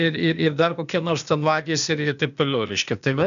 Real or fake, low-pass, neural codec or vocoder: fake; 7.2 kHz; codec, 16 kHz, 0.8 kbps, ZipCodec